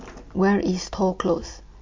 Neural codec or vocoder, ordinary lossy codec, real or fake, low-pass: none; MP3, 48 kbps; real; 7.2 kHz